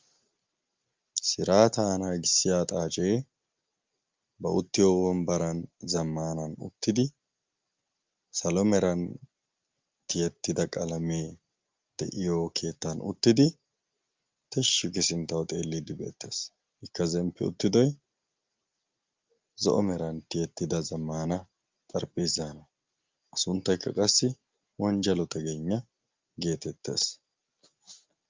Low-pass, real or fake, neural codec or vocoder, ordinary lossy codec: 7.2 kHz; real; none; Opus, 32 kbps